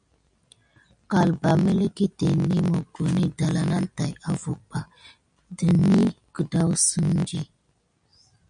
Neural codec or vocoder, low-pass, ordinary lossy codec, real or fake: none; 9.9 kHz; MP3, 96 kbps; real